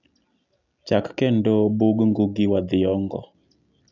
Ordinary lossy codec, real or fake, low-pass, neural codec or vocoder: none; real; 7.2 kHz; none